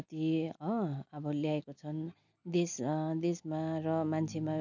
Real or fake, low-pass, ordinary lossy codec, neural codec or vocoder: real; 7.2 kHz; none; none